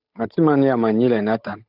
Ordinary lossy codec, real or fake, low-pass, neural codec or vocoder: AAC, 48 kbps; fake; 5.4 kHz; codec, 16 kHz, 8 kbps, FunCodec, trained on Chinese and English, 25 frames a second